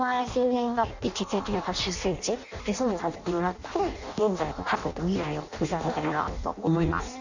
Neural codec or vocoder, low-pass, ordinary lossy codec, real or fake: codec, 16 kHz in and 24 kHz out, 0.6 kbps, FireRedTTS-2 codec; 7.2 kHz; none; fake